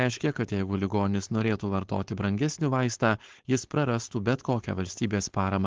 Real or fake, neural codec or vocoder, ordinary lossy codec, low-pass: fake; codec, 16 kHz, 4.8 kbps, FACodec; Opus, 16 kbps; 7.2 kHz